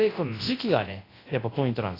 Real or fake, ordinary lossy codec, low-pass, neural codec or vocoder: fake; AAC, 24 kbps; 5.4 kHz; codec, 24 kHz, 0.9 kbps, WavTokenizer, large speech release